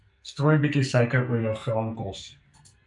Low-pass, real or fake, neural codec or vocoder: 10.8 kHz; fake; codec, 44.1 kHz, 2.6 kbps, SNAC